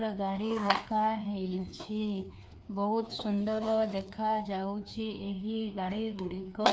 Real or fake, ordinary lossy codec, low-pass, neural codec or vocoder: fake; none; none; codec, 16 kHz, 2 kbps, FreqCodec, larger model